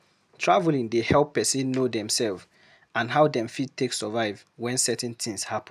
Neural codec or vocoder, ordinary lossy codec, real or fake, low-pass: none; none; real; 14.4 kHz